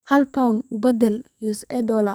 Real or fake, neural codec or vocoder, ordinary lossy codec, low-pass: fake; codec, 44.1 kHz, 2.6 kbps, SNAC; none; none